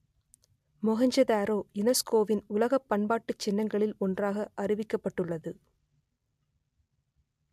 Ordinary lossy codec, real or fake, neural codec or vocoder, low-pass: MP3, 96 kbps; real; none; 14.4 kHz